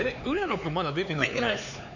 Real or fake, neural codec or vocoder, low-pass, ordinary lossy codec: fake; codec, 16 kHz, 4 kbps, X-Codec, HuBERT features, trained on LibriSpeech; 7.2 kHz; none